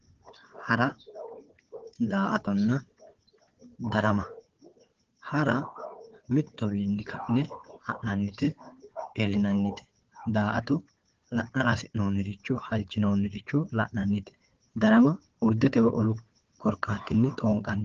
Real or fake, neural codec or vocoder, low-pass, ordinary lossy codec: fake; codec, 16 kHz, 4 kbps, FunCodec, trained on Chinese and English, 50 frames a second; 7.2 kHz; Opus, 16 kbps